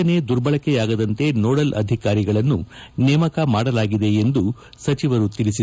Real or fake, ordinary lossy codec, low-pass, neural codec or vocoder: real; none; none; none